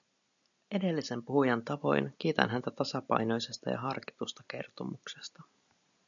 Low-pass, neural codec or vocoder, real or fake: 7.2 kHz; none; real